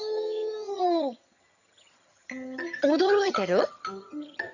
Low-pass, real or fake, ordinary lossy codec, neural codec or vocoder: 7.2 kHz; fake; none; vocoder, 22.05 kHz, 80 mel bands, HiFi-GAN